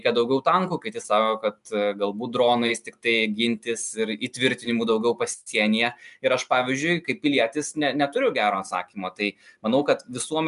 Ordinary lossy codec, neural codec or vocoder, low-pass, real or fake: MP3, 96 kbps; none; 10.8 kHz; real